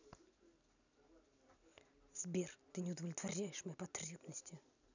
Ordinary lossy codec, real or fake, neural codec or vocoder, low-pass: none; real; none; 7.2 kHz